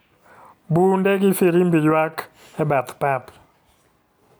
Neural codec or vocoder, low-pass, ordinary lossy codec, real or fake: none; none; none; real